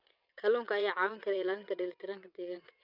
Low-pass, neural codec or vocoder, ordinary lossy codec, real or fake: 5.4 kHz; vocoder, 22.05 kHz, 80 mel bands, WaveNeXt; none; fake